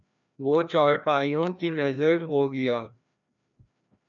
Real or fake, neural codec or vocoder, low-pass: fake; codec, 16 kHz, 1 kbps, FreqCodec, larger model; 7.2 kHz